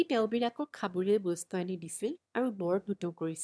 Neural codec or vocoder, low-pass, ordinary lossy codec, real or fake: autoencoder, 22.05 kHz, a latent of 192 numbers a frame, VITS, trained on one speaker; none; none; fake